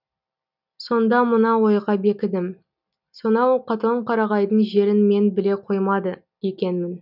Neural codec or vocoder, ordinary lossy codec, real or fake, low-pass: none; none; real; 5.4 kHz